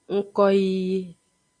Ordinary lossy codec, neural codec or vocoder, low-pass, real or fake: AAC, 48 kbps; none; 9.9 kHz; real